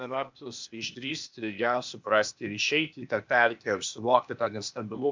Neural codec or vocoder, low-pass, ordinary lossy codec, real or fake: codec, 16 kHz, 0.8 kbps, ZipCodec; 7.2 kHz; MP3, 64 kbps; fake